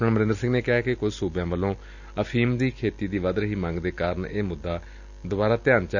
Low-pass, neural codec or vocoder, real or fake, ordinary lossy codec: 7.2 kHz; none; real; none